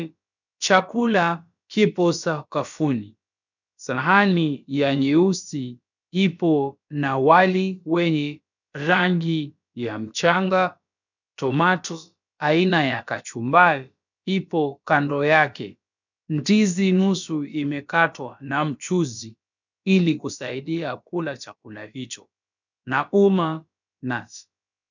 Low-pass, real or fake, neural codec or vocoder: 7.2 kHz; fake; codec, 16 kHz, about 1 kbps, DyCAST, with the encoder's durations